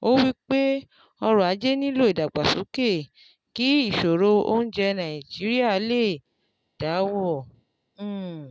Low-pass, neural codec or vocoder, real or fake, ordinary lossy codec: none; none; real; none